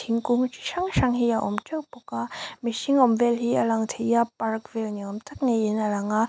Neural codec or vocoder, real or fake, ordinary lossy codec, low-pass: none; real; none; none